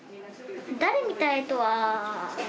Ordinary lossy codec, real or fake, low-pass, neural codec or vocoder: none; real; none; none